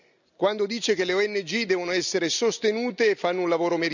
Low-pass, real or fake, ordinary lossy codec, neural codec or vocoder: 7.2 kHz; real; none; none